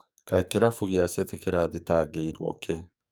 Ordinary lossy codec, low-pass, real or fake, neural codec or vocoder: none; none; fake; codec, 44.1 kHz, 2.6 kbps, SNAC